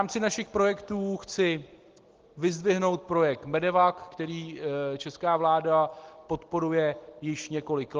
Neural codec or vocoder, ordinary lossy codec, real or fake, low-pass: none; Opus, 16 kbps; real; 7.2 kHz